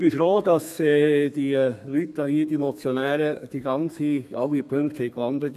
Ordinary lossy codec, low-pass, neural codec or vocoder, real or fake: none; 14.4 kHz; codec, 32 kHz, 1.9 kbps, SNAC; fake